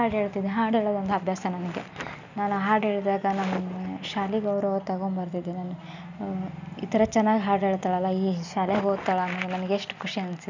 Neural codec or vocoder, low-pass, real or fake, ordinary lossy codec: none; 7.2 kHz; real; none